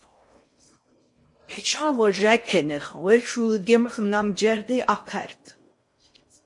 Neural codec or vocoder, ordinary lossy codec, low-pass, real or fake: codec, 16 kHz in and 24 kHz out, 0.6 kbps, FocalCodec, streaming, 4096 codes; MP3, 48 kbps; 10.8 kHz; fake